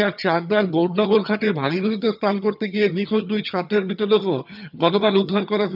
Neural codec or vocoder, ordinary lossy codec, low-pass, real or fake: vocoder, 22.05 kHz, 80 mel bands, HiFi-GAN; none; 5.4 kHz; fake